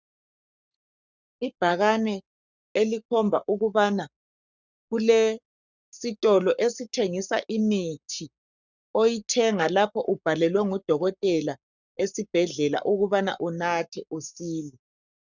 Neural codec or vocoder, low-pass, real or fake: codec, 44.1 kHz, 7.8 kbps, Pupu-Codec; 7.2 kHz; fake